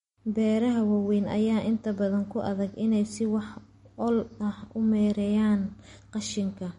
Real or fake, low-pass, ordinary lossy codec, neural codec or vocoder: real; 19.8 kHz; MP3, 48 kbps; none